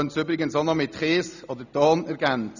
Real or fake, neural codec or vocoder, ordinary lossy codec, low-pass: real; none; none; 7.2 kHz